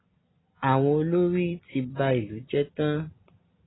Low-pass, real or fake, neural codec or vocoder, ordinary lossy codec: 7.2 kHz; real; none; AAC, 16 kbps